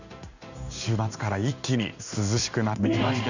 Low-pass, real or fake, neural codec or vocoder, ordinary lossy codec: 7.2 kHz; fake; codec, 16 kHz in and 24 kHz out, 1 kbps, XY-Tokenizer; none